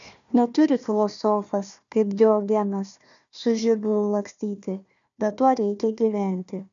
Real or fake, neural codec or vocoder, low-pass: fake; codec, 16 kHz, 1 kbps, FunCodec, trained on Chinese and English, 50 frames a second; 7.2 kHz